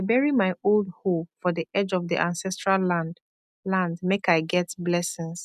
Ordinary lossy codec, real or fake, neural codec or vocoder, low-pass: none; real; none; 14.4 kHz